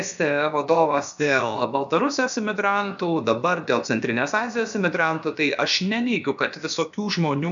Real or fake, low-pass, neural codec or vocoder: fake; 7.2 kHz; codec, 16 kHz, about 1 kbps, DyCAST, with the encoder's durations